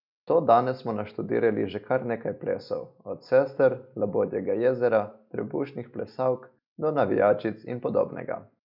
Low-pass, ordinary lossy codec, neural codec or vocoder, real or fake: 5.4 kHz; none; none; real